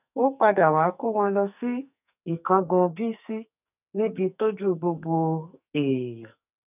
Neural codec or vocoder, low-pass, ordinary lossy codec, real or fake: codec, 44.1 kHz, 2.6 kbps, SNAC; 3.6 kHz; none; fake